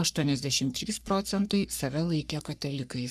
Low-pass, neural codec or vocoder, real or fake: 14.4 kHz; codec, 44.1 kHz, 3.4 kbps, Pupu-Codec; fake